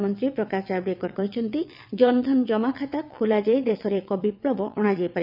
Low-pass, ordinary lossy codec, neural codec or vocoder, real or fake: 5.4 kHz; none; vocoder, 22.05 kHz, 80 mel bands, WaveNeXt; fake